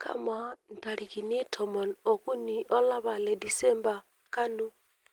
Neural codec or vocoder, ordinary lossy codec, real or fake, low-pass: none; Opus, 24 kbps; real; 19.8 kHz